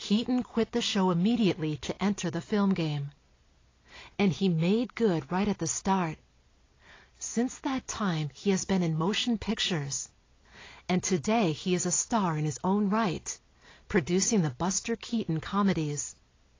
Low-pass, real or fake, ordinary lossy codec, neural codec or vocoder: 7.2 kHz; real; AAC, 32 kbps; none